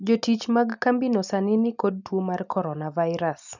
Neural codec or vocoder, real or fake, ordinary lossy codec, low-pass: none; real; none; 7.2 kHz